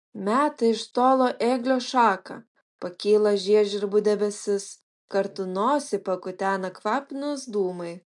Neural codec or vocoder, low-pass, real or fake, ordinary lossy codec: none; 10.8 kHz; real; MP3, 64 kbps